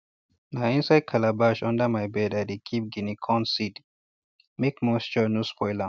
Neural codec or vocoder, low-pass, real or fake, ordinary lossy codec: none; none; real; none